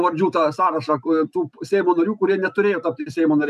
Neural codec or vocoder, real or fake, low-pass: none; real; 14.4 kHz